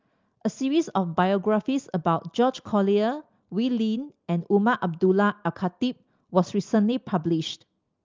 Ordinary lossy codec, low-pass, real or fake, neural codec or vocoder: Opus, 24 kbps; 7.2 kHz; real; none